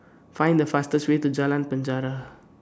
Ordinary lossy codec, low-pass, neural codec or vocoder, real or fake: none; none; none; real